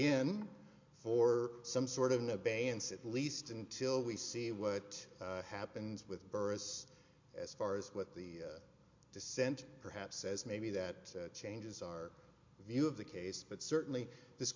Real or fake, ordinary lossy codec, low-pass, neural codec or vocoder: real; MP3, 48 kbps; 7.2 kHz; none